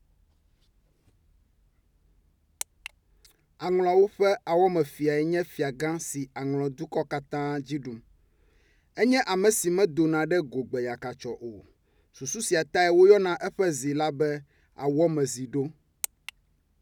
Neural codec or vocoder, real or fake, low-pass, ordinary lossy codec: none; real; 19.8 kHz; none